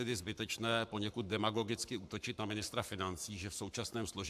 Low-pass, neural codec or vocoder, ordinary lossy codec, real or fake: 14.4 kHz; codec, 44.1 kHz, 7.8 kbps, Pupu-Codec; AAC, 96 kbps; fake